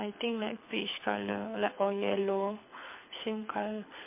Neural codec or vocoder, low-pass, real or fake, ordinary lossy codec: codec, 24 kHz, 6 kbps, HILCodec; 3.6 kHz; fake; MP3, 24 kbps